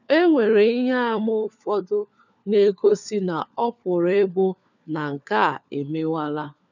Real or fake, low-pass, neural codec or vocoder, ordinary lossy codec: fake; 7.2 kHz; codec, 16 kHz, 4 kbps, FunCodec, trained on LibriTTS, 50 frames a second; none